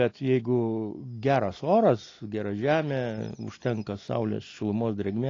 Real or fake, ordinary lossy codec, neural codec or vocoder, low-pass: real; AAC, 32 kbps; none; 7.2 kHz